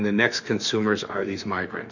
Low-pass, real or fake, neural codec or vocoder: 7.2 kHz; fake; autoencoder, 48 kHz, 32 numbers a frame, DAC-VAE, trained on Japanese speech